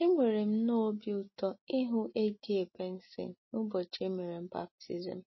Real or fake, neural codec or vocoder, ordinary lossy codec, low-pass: real; none; MP3, 24 kbps; 7.2 kHz